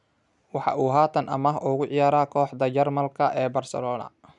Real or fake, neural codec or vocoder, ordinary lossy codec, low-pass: real; none; none; 10.8 kHz